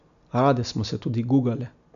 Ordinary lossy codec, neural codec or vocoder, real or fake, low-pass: none; none; real; 7.2 kHz